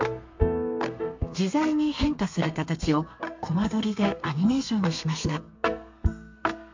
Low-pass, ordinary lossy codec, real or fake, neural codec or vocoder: 7.2 kHz; MP3, 48 kbps; fake; codec, 32 kHz, 1.9 kbps, SNAC